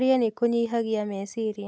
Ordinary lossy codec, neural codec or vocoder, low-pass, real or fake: none; none; none; real